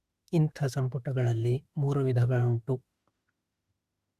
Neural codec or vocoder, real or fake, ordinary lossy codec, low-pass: autoencoder, 48 kHz, 32 numbers a frame, DAC-VAE, trained on Japanese speech; fake; Opus, 64 kbps; 14.4 kHz